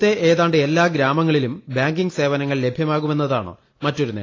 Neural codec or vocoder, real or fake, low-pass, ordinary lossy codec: none; real; 7.2 kHz; AAC, 32 kbps